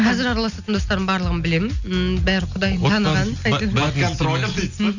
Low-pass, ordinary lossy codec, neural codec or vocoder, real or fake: 7.2 kHz; none; none; real